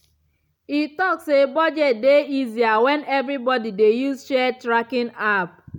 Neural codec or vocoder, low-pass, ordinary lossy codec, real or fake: none; 19.8 kHz; none; real